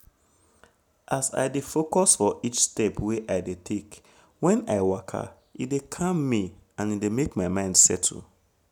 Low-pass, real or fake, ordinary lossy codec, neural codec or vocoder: none; real; none; none